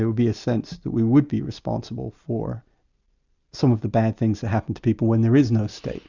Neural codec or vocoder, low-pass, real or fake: none; 7.2 kHz; real